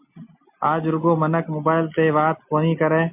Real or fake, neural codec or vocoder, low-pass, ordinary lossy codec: real; none; 3.6 kHz; MP3, 32 kbps